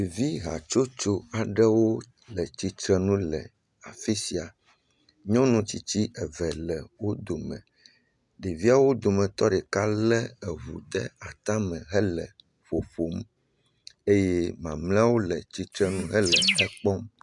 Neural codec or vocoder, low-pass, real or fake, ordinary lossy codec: none; 10.8 kHz; real; MP3, 96 kbps